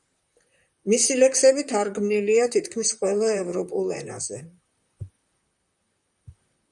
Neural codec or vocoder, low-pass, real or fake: vocoder, 44.1 kHz, 128 mel bands, Pupu-Vocoder; 10.8 kHz; fake